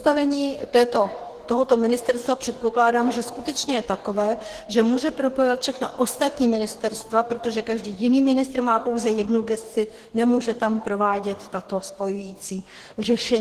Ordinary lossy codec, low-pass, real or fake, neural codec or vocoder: Opus, 16 kbps; 14.4 kHz; fake; codec, 44.1 kHz, 2.6 kbps, DAC